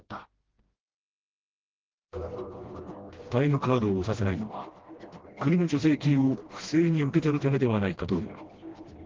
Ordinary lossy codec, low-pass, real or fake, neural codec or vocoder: Opus, 16 kbps; 7.2 kHz; fake; codec, 16 kHz, 1 kbps, FreqCodec, smaller model